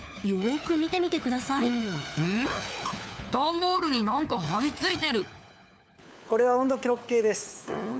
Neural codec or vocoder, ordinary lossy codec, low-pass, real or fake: codec, 16 kHz, 4 kbps, FunCodec, trained on LibriTTS, 50 frames a second; none; none; fake